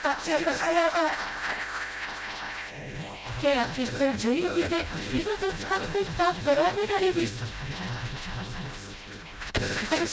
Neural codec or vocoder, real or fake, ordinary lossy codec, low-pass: codec, 16 kHz, 0.5 kbps, FreqCodec, smaller model; fake; none; none